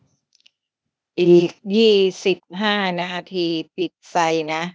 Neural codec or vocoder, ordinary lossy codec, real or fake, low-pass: codec, 16 kHz, 0.8 kbps, ZipCodec; none; fake; none